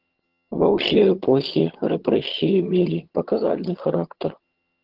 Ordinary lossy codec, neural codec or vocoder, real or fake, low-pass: Opus, 16 kbps; vocoder, 22.05 kHz, 80 mel bands, HiFi-GAN; fake; 5.4 kHz